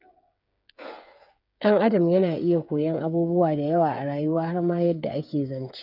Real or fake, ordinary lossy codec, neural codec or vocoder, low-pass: fake; none; codec, 16 kHz, 8 kbps, FreqCodec, smaller model; 5.4 kHz